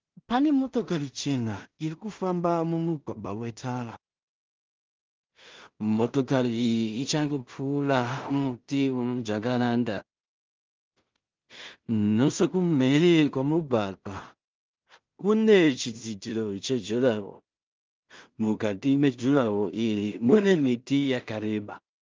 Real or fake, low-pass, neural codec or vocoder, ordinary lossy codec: fake; 7.2 kHz; codec, 16 kHz in and 24 kHz out, 0.4 kbps, LongCat-Audio-Codec, two codebook decoder; Opus, 32 kbps